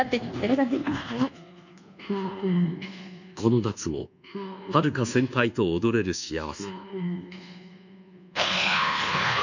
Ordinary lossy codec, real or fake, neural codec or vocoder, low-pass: none; fake; codec, 24 kHz, 1.2 kbps, DualCodec; 7.2 kHz